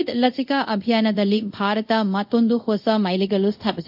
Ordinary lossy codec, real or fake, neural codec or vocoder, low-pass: AAC, 48 kbps; fake; codec, 24 kHz, 0.5 kbps, DualCodec; 5.4 kHz